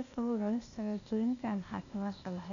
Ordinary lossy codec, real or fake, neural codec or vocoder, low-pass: none; fake; codec, 16 kHz, 0.8 kbps, ZipCodec; 7.2 kHz